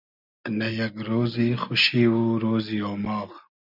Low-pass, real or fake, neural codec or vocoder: 5.4 kHz; real; none